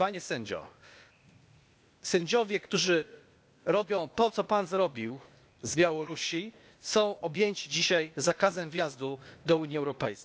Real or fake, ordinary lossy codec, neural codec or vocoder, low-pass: fake; none; codec, 16 kHz, 0.8 kbps, ZipCodec; none